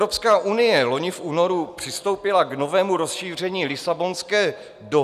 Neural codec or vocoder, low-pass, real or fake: none; 14.4 kHz; real